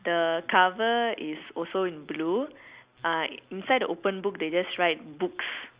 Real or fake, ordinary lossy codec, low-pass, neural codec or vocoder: real; Opus, 64 kbps; 3.6 kHz; none